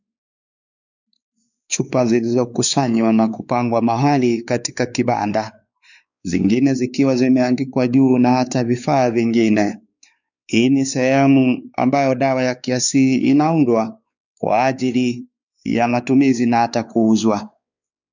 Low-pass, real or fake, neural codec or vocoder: 7.2 kHz; fake; codec, 16 kHz, 4 kbps, X-Codec, WavLM features, trained on Multilingual LibriSpeech